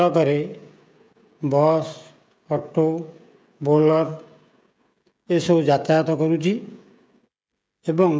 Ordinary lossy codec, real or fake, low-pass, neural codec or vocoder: none; fake; none; codec, 16 kHz, 16 kbps, FreqCodec, smaller model